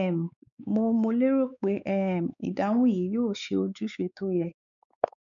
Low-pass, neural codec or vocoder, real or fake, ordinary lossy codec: 7.2 kHz; codec, 16 kHz, 4 kbps, X-Codec, WavLM features, trained on Multilingual LibriSpeech; fake; none